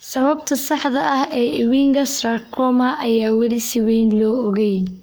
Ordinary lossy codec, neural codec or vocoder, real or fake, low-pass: none; codec, 44.1 kHz, 3.4 kbps, Pupu-Codec; fake; none